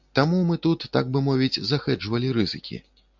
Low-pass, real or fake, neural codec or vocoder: 7.2 kHz; real; none